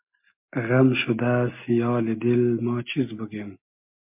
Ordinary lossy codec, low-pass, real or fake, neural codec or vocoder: MP3, 32 kbps; 3.6 kHz; real; none